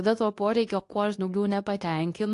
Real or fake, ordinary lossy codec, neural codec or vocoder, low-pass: fake; AAC, 48 kbps; codec, 24 kHz, 0.9 kbps, WavTokenizer, medium speech release version 2; 10.8 kHz